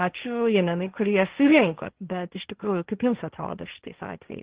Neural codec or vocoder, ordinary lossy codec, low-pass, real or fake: codec, 16 kHz, 1.1 kbps, Voila-Tokenizer; Opus, 16 kbps; 3.6 kHz; fake